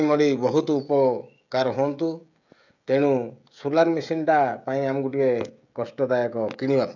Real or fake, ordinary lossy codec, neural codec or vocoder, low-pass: fake; none; codec, 16 kHz, 16 kbps, FreqCodec, smaller model; 7.2 kHz